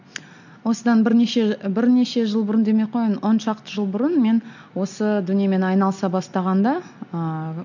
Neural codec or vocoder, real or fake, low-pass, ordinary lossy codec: none; real; 7.2 kHz; none